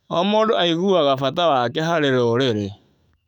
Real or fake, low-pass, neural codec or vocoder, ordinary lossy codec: fake; 19.8 kHz; autoencoder, 48 kHz, 128 numbers a frame, DAC-VAE, trained on Japanese speech; none